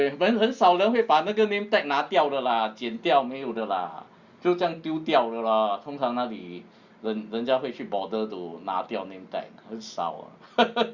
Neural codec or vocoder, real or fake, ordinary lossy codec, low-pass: none; real; Opus, 64 kbps; 7.2 kHz